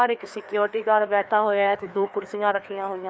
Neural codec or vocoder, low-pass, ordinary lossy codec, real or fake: codec, 16 kHz, 2 kbps, FreqCodec, larger model; none; none; fake